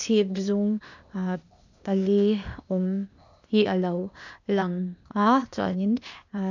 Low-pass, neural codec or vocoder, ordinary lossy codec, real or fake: 7.2 kHz; codec, 16 kHz, 0.8 kbps, ZipCodec; none; fake